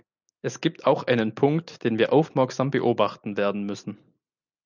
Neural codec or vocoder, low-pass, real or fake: none; 7.2 kHz; real